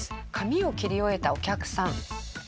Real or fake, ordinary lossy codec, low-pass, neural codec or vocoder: real; none; none; none